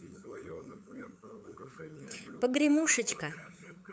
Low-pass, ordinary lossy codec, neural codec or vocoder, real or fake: none; none; codec, 16 kHz, 8 kbps, FunCodec, trained on LibriTTS, 25 frames a second; fake